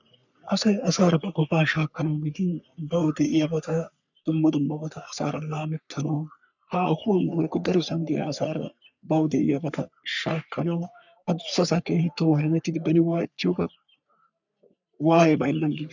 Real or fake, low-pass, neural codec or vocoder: fake; 7.2 kHz; codec, 44.1 kHz, 3.4 kbps, Pupu-Codec